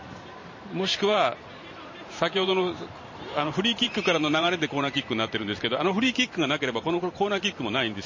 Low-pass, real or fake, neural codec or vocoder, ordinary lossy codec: 7.2 kHz; fake; vocoder, 44.1 kHz, 128 mel bands every 512 samples, BigVGAN v2; MP3, 32 kbps